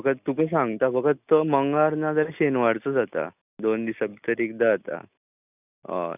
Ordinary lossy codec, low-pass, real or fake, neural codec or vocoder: none; 3.6 kHz; real; none